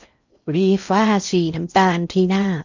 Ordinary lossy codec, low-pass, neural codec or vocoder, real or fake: none; 7.2 kHz; codec, 16 kHz in and 24 kHz out, 0.6 kbps, FocalCodec, streaming, 4096 codes; fake